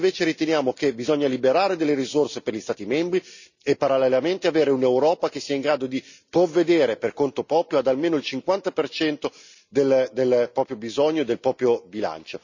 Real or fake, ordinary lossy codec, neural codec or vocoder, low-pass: real; none; none; 7.2 kHz